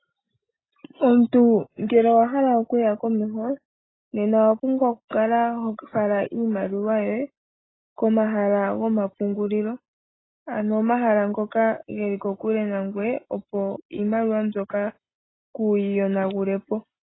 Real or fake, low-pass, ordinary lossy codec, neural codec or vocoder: real; 7.2 kHz; AAC, 16 kbps; none